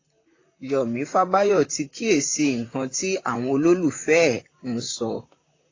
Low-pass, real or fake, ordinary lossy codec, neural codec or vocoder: 7.2 kHz; fake; AAC, 32 kbps; vocoder, 44.1 kHz, 128 mel bands, Pupu-Vocoder